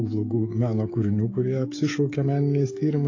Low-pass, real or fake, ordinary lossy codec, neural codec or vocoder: 7.2 kHz; fake; AAC, 32 kbps; codec, 16 kHz, 16 kbps, FreqCodec, smaller model